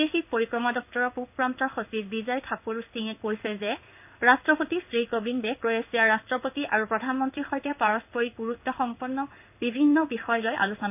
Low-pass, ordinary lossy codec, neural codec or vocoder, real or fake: 3.6 kHz; none; codec, 16 kHz in and 24 kHz out, 1 kbps, XY-Tokenizer; fake